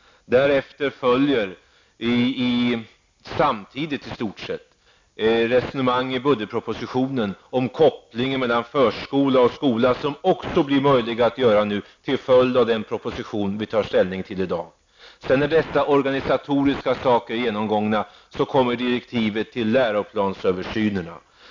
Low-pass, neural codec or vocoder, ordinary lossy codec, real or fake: 7.2 kHz; none; MP3, 64 kbps; real